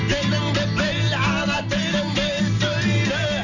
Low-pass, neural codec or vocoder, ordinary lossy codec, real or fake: 7.2 kHz; none; none; real